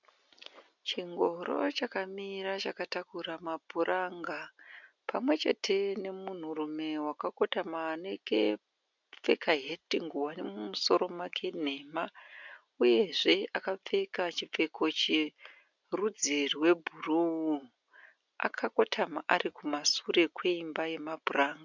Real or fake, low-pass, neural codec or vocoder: real; 7.2 kHz; none